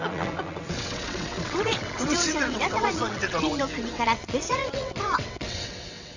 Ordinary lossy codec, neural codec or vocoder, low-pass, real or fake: none; vocoder, 22.05 kHz, 80 mel bands, WaveNeXt; 7.2 kHz; fake